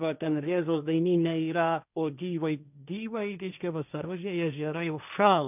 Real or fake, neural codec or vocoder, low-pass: fake; codec, 16 kHz, 1.1 kbps, Voila-Tokenizer; 3.6 kHz